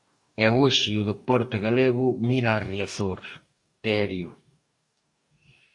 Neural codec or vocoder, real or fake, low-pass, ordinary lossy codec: codec, 44.1 kHz, 2.6 kbps, DAC; fake; 10.8 kHz; AAC, 64 kbps